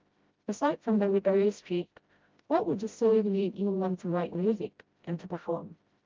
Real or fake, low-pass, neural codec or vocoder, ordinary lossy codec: fake; 7.2 kHz; codec, 16 kHz, 0.5 kbps, FreqCodec, smaller model; Opus, 24 kbps